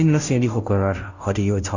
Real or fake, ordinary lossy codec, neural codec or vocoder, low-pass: fake; none; codec, 16 kHz, 0.5 kbps, FunCodec, trained on Chinese and English, 25 frames a second; 7.2 kHz